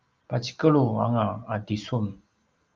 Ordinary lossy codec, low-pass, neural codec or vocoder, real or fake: Opus, 24 kbps; 7.2 kHz; none; real